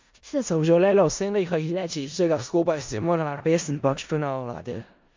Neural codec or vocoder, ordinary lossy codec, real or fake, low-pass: codec, 16 kHz in and 24 kHz out, 0.4 kbps, LongCat-Audio-Codec, four codebook decoder; MP3, 64 kbps; fake; 7.2 kHz